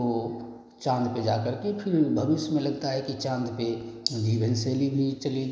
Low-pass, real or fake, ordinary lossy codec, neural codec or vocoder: none; real; none; none